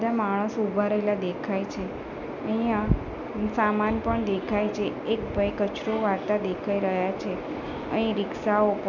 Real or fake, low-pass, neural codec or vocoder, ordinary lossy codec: real; 7.2 kHz; none; none